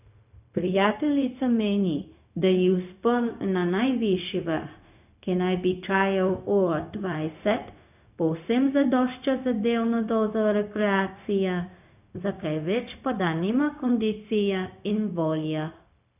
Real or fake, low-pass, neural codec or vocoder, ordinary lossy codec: fake; 3.6 kHz; codec, 16 kHz, 0.4 kbps, LongCat-Audio-Codec; none